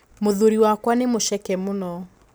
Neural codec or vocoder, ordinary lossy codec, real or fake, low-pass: none; none; real; none